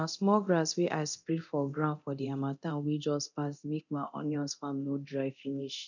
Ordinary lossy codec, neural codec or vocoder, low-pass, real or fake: none; codec, 24 kHz, 0.9 kbps, DualCodec; 7.2 kHz; fake